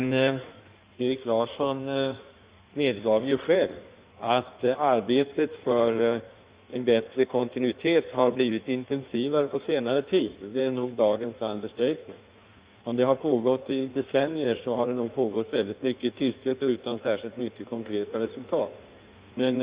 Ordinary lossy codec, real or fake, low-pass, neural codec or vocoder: Opus, 64 kbps; fake; 3.6 kHz; codec, 16 kHz in and 24 kHz out, 1.1 kbps, FireRedTTS-2 codec